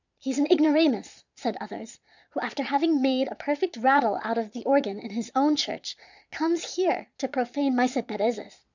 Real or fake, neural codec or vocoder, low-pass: fake; vocoder, 22.05 kHz, 80 mel bands, Vocos; 7.2 kHz